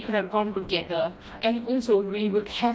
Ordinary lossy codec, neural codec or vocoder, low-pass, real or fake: none; codec, 16 kHz, 1 kbps, FreqCodec, smaller model; none; fake